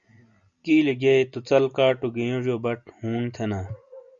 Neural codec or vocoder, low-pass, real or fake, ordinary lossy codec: none; 7.2 kHz; real; Opus, 64 kbps